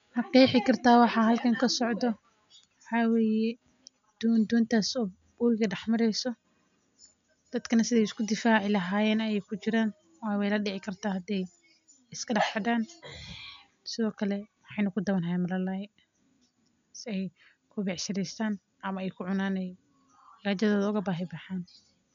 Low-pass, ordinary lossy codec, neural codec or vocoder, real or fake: 7.2 kHz; MP3, 64 kbps; none; real